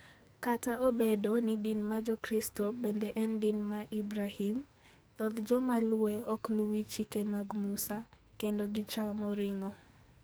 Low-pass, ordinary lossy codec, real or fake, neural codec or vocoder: none; none; fake; codec, 44.1 kHz, 2.6 kbps, SNAC